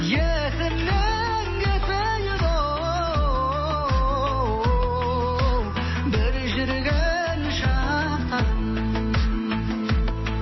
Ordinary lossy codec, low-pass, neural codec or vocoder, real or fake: MP3, 24 kbps; 7.2 kHz; none; real